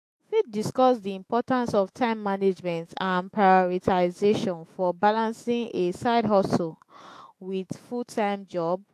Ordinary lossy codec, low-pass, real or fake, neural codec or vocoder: AAC, 64 kbps; 14.4 kHz; fake; autoencoder, 48 kHz, 128 numbers a frame, DAC-VAE, trained on Japanese speech